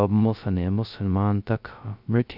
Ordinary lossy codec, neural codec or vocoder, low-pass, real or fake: none; codec, 16 kHz, 0.2 kbps, FocalCodec; 5.4 kHz; fake